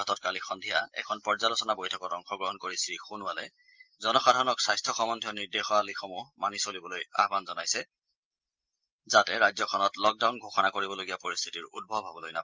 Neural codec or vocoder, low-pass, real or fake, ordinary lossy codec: none; 7.2 kHz; real; Opus, 24 kbps